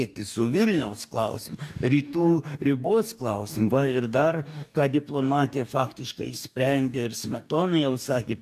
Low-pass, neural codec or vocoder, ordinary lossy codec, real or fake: 14.4 kHz; codec, 44.1 kHz, 2.6 kbps, DAC; AAC, 96 kbps; fake